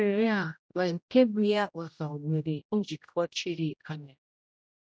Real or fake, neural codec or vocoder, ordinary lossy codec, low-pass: fake; codec, 16 kHz, 0.5 kbps, X-Codec, HuBERT features, trained on general audio; none; none